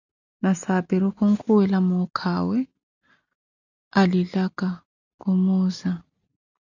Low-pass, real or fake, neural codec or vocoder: 7.2 kHz; real; none